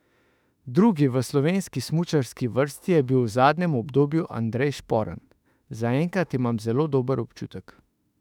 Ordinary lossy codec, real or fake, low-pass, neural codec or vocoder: none; fake; 19.8 kHz; autoencoder, 48 kHz, 32 numbers a frame, DAC-VAE, trained on Japanese speech